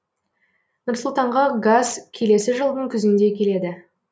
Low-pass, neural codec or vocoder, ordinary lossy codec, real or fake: none; none; none; real